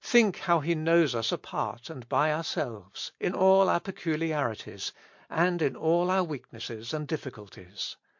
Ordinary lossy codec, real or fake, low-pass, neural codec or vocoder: MP3, 48 kbps; real; 7.2 kHz; none